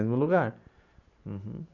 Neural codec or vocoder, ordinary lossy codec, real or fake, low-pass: vocoder, 44.1 kHz, 128 mel bands every 512 samples, BigVGAN v2; none; fake; 7.2 kHz